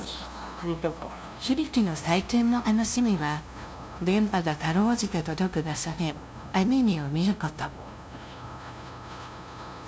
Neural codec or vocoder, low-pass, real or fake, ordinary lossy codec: codec, 16 kHz, 0.5 kbps, FunCodec, trained on LibriTTS, 25 frames a second; none; fake; none